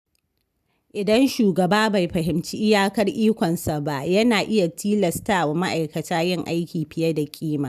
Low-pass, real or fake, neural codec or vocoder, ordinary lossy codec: 14.4 kHz; real; none; none